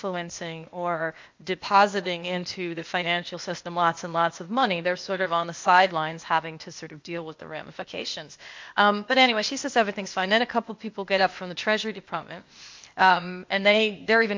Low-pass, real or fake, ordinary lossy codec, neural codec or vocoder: 7.2 kHz; fake; MP3, 48 kbps; codec, 16 kHz, 0.8 kbps, ZipCodec